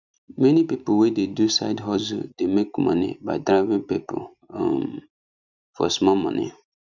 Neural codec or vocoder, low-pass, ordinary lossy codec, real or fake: none; 7.2 kHz; none; real